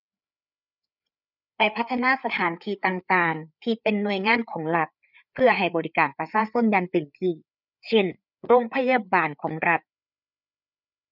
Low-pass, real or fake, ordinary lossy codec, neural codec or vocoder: 5.4 kHz; fake; none; codec, 16 kHz, 4 kbps, FreqCodec, larger model